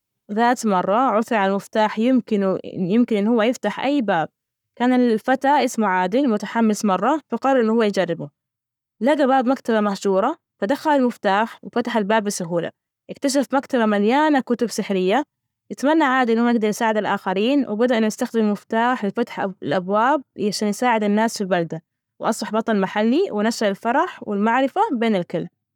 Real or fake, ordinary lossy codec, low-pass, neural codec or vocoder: fake; none; 19.8 kHz; codec, 44.1 kHz, 7.8 kbps, Pupu-Codec